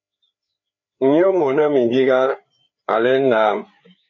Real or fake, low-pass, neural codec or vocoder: fake; 7.2 kHz; codec, 16 kHz, 4 kbps, FreqCodec, larger model